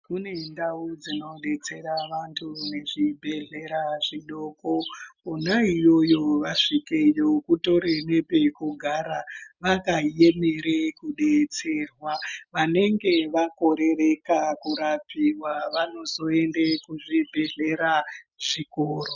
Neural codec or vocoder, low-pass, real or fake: none; 7.2 kHz; real